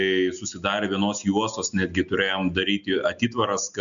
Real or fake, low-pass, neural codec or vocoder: real; 7.2 kHz; none